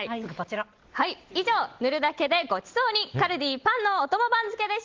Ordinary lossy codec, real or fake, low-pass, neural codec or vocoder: Opus, 16 kbps; real; 7.2 kHz; none